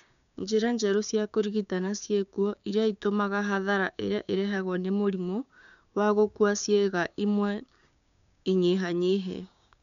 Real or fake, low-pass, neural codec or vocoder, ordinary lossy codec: fake; 7.2 kHz; codec, 16 kHz, 6 kbps, DAC; none